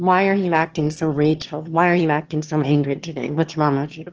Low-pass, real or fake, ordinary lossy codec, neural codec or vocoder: 7.2 kHz; fake; Opus, 16 kbps; autoencoder, 22.05 kHz, a latent of 192 numbers a frame, VITS, trained on one speaker